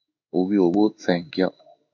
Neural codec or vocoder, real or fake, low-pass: codec, 16 kHz in and 24 kHz out, 1 kbps, XY-Tokenizer; fake; 7.2 kHz